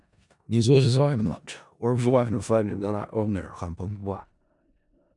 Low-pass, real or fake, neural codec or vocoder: 10.8 kHz; fake; codec, 16 kHz in and 24 kHz out, 0.4 kbps, LongCat-Audio-Codec, four codebook decoder